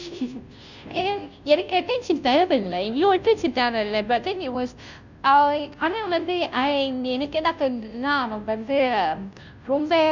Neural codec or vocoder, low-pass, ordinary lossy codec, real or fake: codec, 16 kHz, 0.5 kbps, FunCodec, trained on Chinese and English, 25 frames a second; 7.2 kHz; none; fake